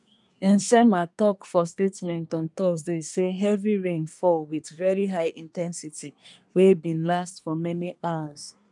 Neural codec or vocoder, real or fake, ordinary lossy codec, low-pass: codec, 24 kHz, 1 kbps, SNAC; fake; none; 10.8 kHz